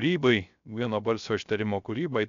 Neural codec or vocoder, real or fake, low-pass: codec, 16 kHz, 0.3 kbps, FocalCodec; fake; 7.2 kHz